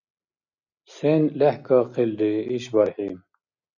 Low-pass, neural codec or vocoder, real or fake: 7.2 kHz; none; real